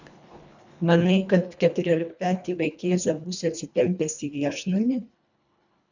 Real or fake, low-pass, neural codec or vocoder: fake; 7.2 kHz; codec, 24 kHz, 1.5 kbps, HILCodec